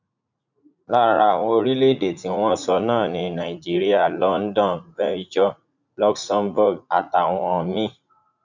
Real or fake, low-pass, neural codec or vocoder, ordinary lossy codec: fake; 7.2 kHz; vocoder, 44.1 kHz, 80 mel bands, Vocos; none